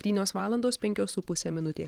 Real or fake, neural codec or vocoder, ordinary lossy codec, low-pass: fake; vocoder, 44.1 kHz, 128 mel bands every 512 samples, BigVGAN v2; Opus, 24 kbps; 19.8 kHz